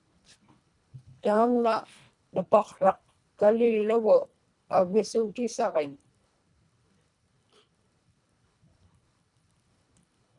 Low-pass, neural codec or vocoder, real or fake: 10.8 kHz; codec, 24 kHz, 1.5 kbps, HILCodec; fake